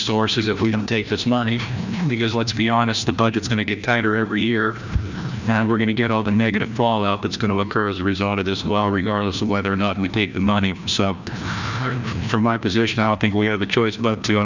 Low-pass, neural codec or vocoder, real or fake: 7.2 kHz; codec, 16 kHz, 1 kbps, FreqCodec, larger model; fake